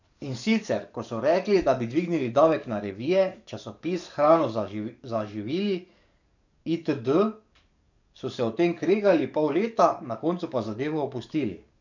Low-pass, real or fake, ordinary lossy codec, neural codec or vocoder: 7.2 kHz; fake; none; codec, 44.1 kHz, 7.8 kbps, DAC